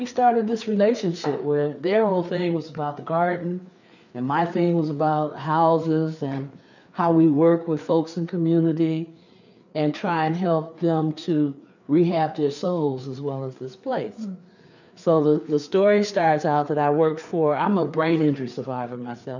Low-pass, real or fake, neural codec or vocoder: 7.2 kHz; fake; codec, 16 kHz, 4 kbps, FreqCodec, larger model